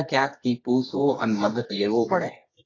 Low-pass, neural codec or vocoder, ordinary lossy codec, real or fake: 7.2 kHz; codec, 24 kHz, 0.9 kbps, WavTokenizer, medium music audio release; AAC, 32 kbps; fake